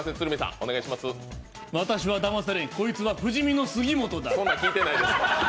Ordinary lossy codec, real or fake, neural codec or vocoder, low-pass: none; real; none; none